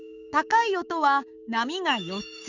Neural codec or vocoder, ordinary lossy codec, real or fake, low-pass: codec, 16 kHz, 6 kbps, DAC; none; fake; 7.2 kHz